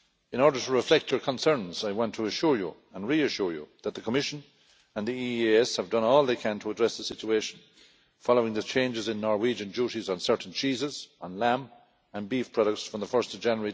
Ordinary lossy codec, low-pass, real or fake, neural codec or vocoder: none; none; real; none